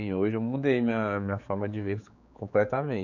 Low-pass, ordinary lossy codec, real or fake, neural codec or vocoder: 7.2 kHz; none; fake; codec, 16 kHz, 4 kbps, X-Codec, HuBERT features, trained on general audio